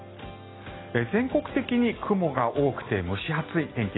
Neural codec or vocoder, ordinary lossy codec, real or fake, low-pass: none; AAC, 16 kbps; real; 7.2 kHz